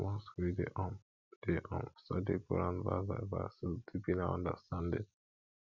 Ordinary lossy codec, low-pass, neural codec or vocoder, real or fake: none; 7.2 kHz; none; real